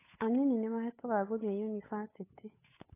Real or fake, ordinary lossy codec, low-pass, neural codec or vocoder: fake; AAC, 16 kbps; 3.6 kHz; codec, 16 kHz, 8 kbps, FunCodec, trained on Chinese and English, 25 frames a second